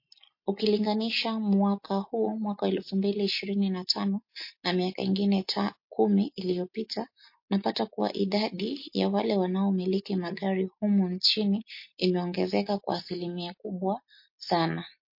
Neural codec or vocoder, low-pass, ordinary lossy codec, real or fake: none; 5.4 kHz; MP3, 32 kbps; real